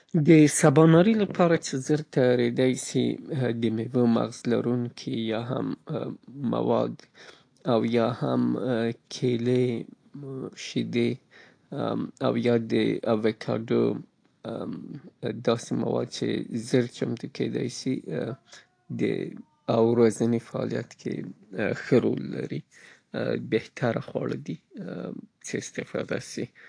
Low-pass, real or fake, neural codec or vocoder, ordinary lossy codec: 9.9 kHz; real; none; AAC, 48 kbps